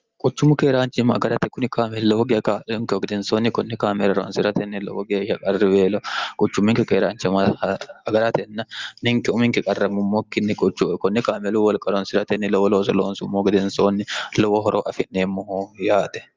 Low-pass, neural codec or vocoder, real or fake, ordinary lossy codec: 7.2 kHz; none; real; Opus, 32 kbps